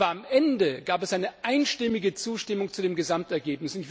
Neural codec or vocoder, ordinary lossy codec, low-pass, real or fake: none; none; none; real